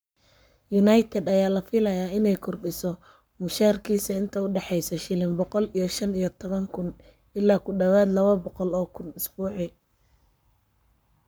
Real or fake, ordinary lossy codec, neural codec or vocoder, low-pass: fake; none; codec, 44.1 kHz, 7.8 kbps, Pupu-Codec; none